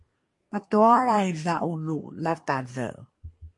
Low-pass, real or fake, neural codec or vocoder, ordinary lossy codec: 10.8 kHz; fake; codec, 24 kHz, 1 kbps, SNAC; MP3, 48 kbps